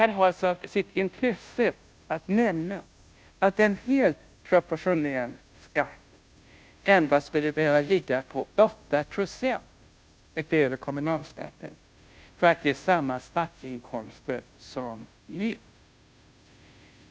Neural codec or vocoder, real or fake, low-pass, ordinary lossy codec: codec, 16 kHz, 0.5 kbps, FunCodec, trained on Chinese and English, 25 frames a second; fake; none; none